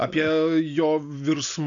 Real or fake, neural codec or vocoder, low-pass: real; none; 7.2 kHz